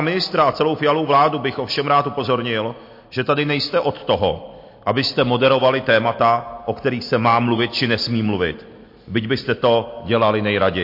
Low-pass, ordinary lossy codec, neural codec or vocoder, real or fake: 5.4 kHz; MP3, 32 kbps; none; real